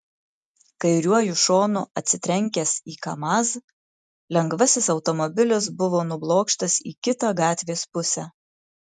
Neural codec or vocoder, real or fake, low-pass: none; real; 10.8 kHz